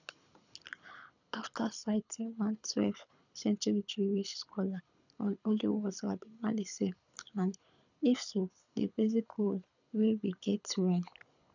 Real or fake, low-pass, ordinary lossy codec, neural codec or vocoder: fake; 7.2 kHz; none; codec, 24 kHz, 6 kbps, HILCodec